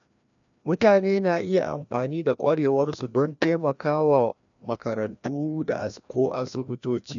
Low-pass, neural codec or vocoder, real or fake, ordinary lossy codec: 7.2 kHz; codec, 16 kHz, 1 kbps, FreqCodec, larger model; fake; none